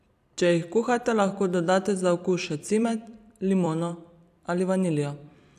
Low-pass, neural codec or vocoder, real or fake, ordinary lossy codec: 14.4 kHz; vocoder, 44.1 kHz, 128 mel bands every 256 samples, BigVGAN v2; fake; none